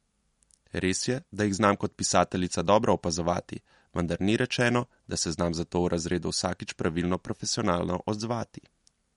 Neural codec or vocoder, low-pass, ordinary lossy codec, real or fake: none; 19.8 kHz; MP3, 48 kbps; real